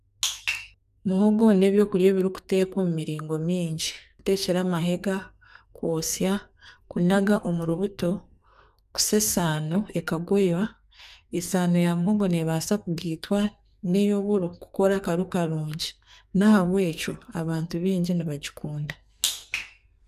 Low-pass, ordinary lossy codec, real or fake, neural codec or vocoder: 14.4 kHz; none; fake; codec, 44.1 kHz, 2.6 kbps, SNAC